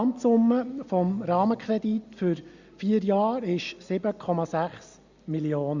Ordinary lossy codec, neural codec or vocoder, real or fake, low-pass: Opus, 64 kbps; none; real; 7.2 kHz